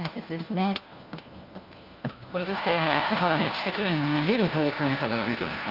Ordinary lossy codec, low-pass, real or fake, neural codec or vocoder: Opus, 32 kbps; 5.4 kHz; fake; codec, 16 kHz, 1 kbps, FunCodec, trained on LibriTTS, 50 frames a second